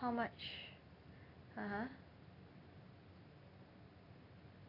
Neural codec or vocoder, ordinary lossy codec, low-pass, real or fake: none; none; 5.4 kHz; real